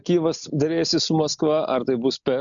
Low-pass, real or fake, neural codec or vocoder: 7.2 kHz; real; none